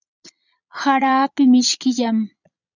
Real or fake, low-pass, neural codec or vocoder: fake; 7.2 kHz; vocoder, 22.05 kHz, 80 mel bands, Vocos